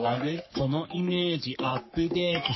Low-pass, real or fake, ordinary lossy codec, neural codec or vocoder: 7.2 kHz; fake; MP3, 24 kbps; codec, 44.1 kHz, 3.4 kbps, Pupu-Codec